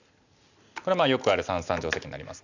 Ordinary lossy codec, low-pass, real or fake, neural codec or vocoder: none; 7.2 kHz; real; none